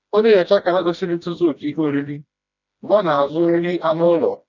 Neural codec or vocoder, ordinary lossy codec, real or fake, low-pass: codec, 16 kHz, 1 kbps, FreqCodec, smaller model; none; fake; 7.2 kHz